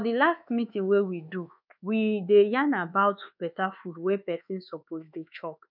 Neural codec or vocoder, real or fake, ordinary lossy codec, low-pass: codec, 24 kHz, 1.2 kbps, DualCodec; fake; none; 5.4 kHz